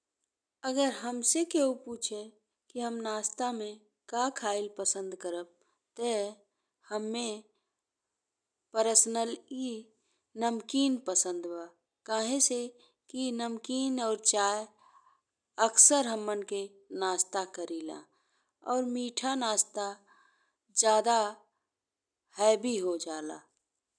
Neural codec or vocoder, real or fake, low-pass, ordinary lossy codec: none; real; 9.9 kHz; none